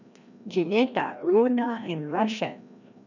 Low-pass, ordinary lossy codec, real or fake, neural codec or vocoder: 7.2 kHz; none; fake; codec, 16 kHz, 1 kbps, FreqCodec, larger model